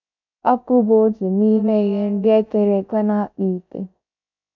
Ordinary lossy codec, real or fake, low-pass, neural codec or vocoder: none; fake; 7.2 kHz; codec, 16 kHz, 0.3 kbps, FocalCodec